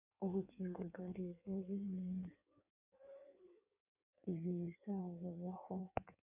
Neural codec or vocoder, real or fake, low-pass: codec, 16 kHz in and 24 kHz out, 1.1 kbps, FireRedTTS-2 codec; fake; 3.6 kHz